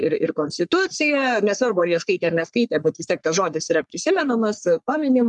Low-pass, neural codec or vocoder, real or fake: 10.8 kHz; codec, 44.1 kHz, 3.4 kbps, Pupu-Codec; fake